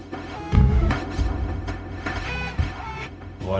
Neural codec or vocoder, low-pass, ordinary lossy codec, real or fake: codec, 16 kHz, 0.4 kbps, LongCat-Audio-Codec; none; none; fake